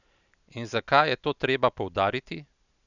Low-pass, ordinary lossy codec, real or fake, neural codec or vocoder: 7.2 kHz; none; real; none